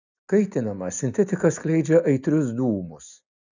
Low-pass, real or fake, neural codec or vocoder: 7.2 kHz; real; none